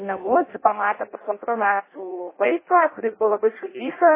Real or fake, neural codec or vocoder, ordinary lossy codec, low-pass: fake; codec, 16 kHz in and 24 kHz out, 0.6 kbps, FireRedTTS-2 codec; MP3, 16 kbps; 3.6 kHz